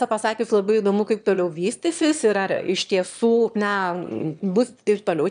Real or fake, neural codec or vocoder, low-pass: fake; autoencoder, 22.05 kHz, a latent of 192 numbers a frame, VITS, trained on one speaker; 9.9 kHz